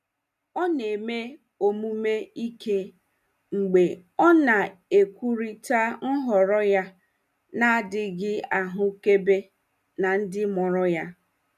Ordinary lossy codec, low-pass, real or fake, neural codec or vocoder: none; 14.4 kHz; real; none